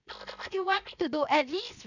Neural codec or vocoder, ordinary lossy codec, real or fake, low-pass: codec, 16 kHz, 0.7 kbps, FocalCodec; none; fake; 7.2 kHz